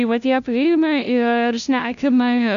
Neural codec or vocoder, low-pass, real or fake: codec, 16 kHz, 0.5 kbps, FunCodec, trained on LibriTTS, 25 frames a second; 7.2 kHz; fake